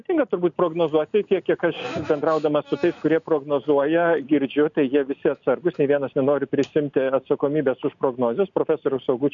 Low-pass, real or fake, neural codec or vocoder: 7.2 kHz; real; none